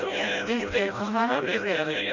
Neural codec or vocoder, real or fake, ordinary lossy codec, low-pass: codec, 16 kHz, 0.5 kbps, FreqCodec, smaller model; fake; none; 7.2 kHz